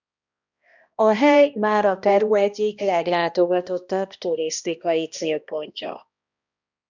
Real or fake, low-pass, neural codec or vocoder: fake; 7.2 kHz; codec, 16 kHz, 1 kbps, X-Codec, HuBERT features, trained on balanced general audio